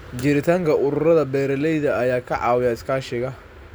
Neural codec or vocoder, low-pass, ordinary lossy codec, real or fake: none; none; none; real